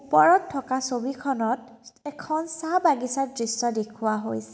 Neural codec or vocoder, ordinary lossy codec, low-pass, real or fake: none; none; none; real